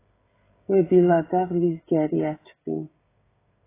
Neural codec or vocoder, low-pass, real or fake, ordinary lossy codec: codec, 16 kHz, 16 kbps, FreqCodec, smaller model; 3.6 kHz; fake; AAC, 16 kbps